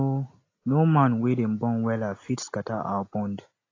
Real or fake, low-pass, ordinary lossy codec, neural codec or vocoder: real; 7.2 kHz; AAC, 32 kbps; none